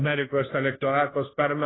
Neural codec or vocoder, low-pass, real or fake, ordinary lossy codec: codec, 16 kHz, 0.5 kbps, FunCodec, trained on Chinese and English, 25 frames a second; 7.2 kHz; fake; AAC, 16 kbps